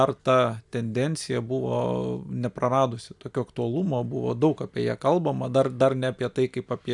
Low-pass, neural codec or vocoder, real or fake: 10.8 kHz; none; real